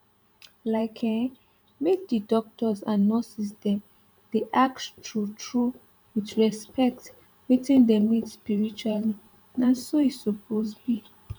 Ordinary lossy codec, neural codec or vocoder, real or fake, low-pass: none; vocoder, 44.1 kHz, 128 mel bands every 512 samples, BigVGAN v2; fake; 19.8 kHz